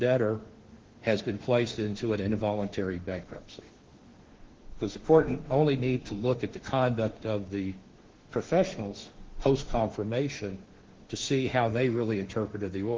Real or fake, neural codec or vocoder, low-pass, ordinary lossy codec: fake; autoencoder, 48 kHz, 32 numbers a frame, DAC-VAE, trained on Japanese speech; 7.2 kHz; Opus, 16 kbps